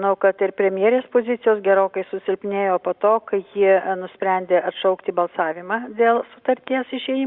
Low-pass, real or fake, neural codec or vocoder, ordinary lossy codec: 5.4 kHz; real; none; Opus, 64 kbps